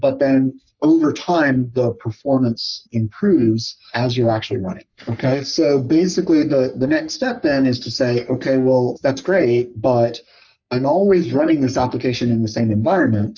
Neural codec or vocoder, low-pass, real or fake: codec, 44.1 kHz, 3.4 kbps, Pupu-Codec; 7.2 kHz; fake